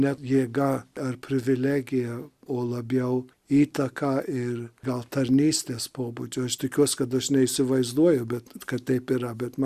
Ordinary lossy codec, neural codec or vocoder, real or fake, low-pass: Opus, 64 kbps; none; real; 14.4 kHz